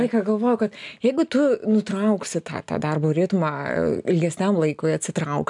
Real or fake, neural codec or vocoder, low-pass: real; none; 10.8 kHz